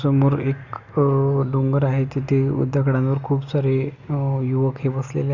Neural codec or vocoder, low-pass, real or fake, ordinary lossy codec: none; 7.2 kHz; real; none